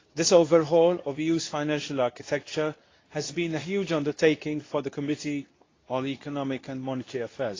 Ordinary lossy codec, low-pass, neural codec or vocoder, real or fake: AAC, 32 kbps; 7.2 kHz; codec, 24 kHz, 0.9 kbps, WavTokenizer, medium speech release version 2; fake